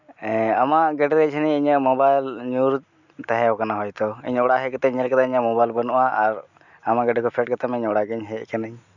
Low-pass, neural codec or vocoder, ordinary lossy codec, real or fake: 7.2 kHz; none; none; real